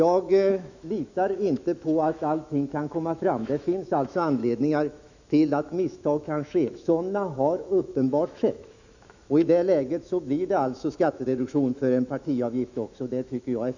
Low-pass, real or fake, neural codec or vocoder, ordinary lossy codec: 7.2 kHz; fake; autoencoder, 48 kHz, 128 numbers a frame, DAC-VAE, trained on Japanese speech; none